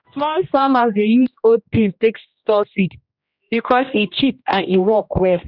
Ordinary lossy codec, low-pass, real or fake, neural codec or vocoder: none; 5.4 kHz; fake; codec, 16 kHz, 1 kbps, X-Codec, HuBERT features, trained on general audio